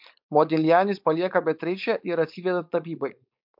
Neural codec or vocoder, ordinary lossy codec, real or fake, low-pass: codec, 16 kHz, 4.8 kbps, FACodec; MP3, 48 kbps; fake; 5.4 kHz